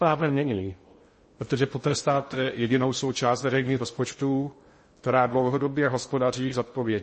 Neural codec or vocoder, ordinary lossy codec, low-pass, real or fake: codec, 16 kHz in and 24 kHz out, 0.6 kbps, FocalCodec, streaming, 2048 codes; MP3, 32 kbps; 10.8 kHz; fake